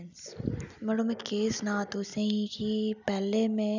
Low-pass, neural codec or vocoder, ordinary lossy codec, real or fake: 7.2 kHz; none; none; real